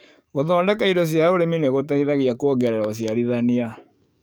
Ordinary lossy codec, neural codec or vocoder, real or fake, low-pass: none; codec, 44.1 kHz, 7.8 kbps, DAC; fake; none